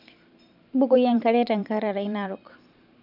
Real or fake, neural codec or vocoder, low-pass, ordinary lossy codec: fake; vocoder, 44.1 kHz, 128 mel bands every 512 samples, BigVGAN v2; 5.4 kHz; none